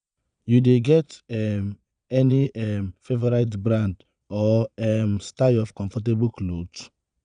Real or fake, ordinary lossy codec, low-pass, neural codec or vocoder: fake; none; 9.9 kHz; vocoder, 22.05 kHz, 80 mel bands, Vocos